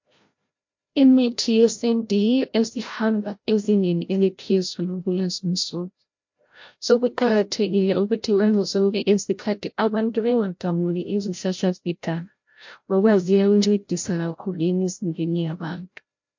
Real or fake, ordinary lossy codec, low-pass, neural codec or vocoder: fake; MP3, 48 kbps; 7.2 kHz; codec, 16 kHz, 0.5 kbps, FreqCodec, larger model